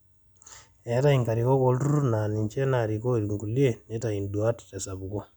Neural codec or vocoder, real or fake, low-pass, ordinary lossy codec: none; real; 19.8 kHz; none